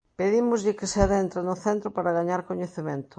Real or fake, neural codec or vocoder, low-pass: real; none; 9.9 kHz